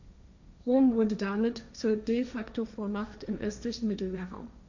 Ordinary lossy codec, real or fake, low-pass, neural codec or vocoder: none; fake; 7.2 kHz; codec, 16 kHz, 1.1 kbps, Voila-Tokenizer